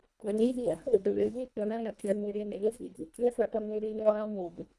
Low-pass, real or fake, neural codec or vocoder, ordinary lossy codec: none; fake; codec, 24 kHz, 1.5 kbps, HILCodec; none